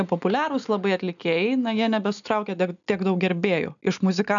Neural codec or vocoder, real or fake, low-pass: none; real; 7.2 kHz